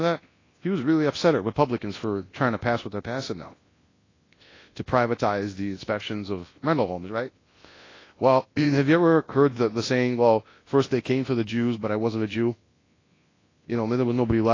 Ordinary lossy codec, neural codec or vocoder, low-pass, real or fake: AAC, 32 kbps; codec, 24 kHz, 0.9 kbps, WavTokenizer, large speech release; 7.2 kHz; fake